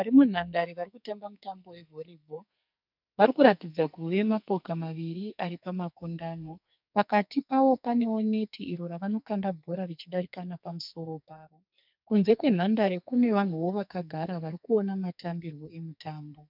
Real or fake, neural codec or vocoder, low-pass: fake; codec, 44.1 kHz, 2.6 kbps, SNAC; 5.4 kHz